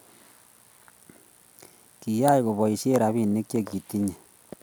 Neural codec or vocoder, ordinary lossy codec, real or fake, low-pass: none; none; real; none